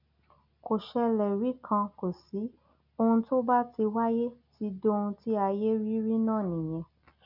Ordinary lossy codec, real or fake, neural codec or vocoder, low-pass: none; real; none; 5.4 kHz